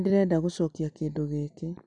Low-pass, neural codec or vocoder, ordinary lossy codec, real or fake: 10.8 kHz; none; none; real